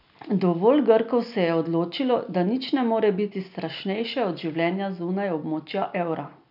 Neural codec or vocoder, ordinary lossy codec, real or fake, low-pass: none; none; real; 5.4 kHz